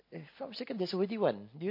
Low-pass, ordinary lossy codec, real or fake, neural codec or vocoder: 5.4 kHz; MP3, 32 kbps; real; none